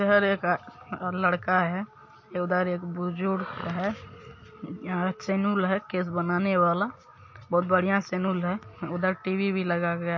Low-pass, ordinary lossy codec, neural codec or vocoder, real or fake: 7.2 kHz; MP3, 32 kbps; none; real